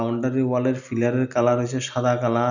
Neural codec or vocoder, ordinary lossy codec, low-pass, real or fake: none; none; 7.2 kHz; real